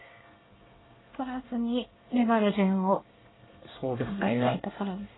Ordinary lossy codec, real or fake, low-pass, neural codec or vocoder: AAC, 16 kbps; fake; 7.2 kHz; codec, 24 kHz, 1 kbps, SNAC